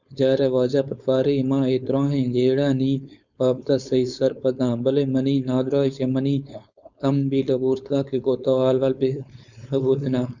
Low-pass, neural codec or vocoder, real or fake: 7.2 kHz; codec, 16 kHz, 4.8 kbps, FACodec; fake